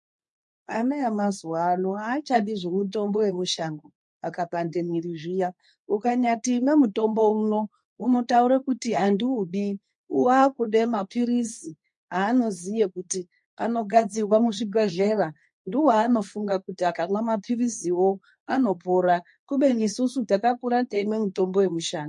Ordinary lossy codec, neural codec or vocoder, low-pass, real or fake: MP3, 48 kbps; codec, 24 kHz, 0.9 kbps, WavTokenizer, medium speech release version 2; 10.8 kHz; fake